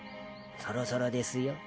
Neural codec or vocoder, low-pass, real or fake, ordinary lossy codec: none; none; real; none